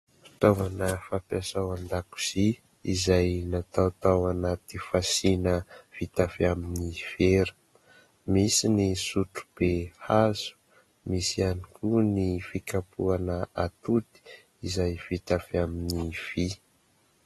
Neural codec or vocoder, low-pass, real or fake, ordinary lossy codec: none; 19.8 kHz; real; AAC, 32 kbps